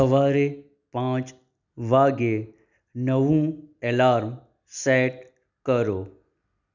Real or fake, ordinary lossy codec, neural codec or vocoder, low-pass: real; none; none; 7.2 kHz